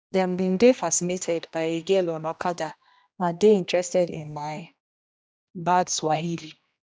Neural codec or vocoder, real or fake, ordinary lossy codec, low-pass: codec, 16 kHz, 1 kbps, X-Codec, HuBERT features, trained on general audio; fake; none; none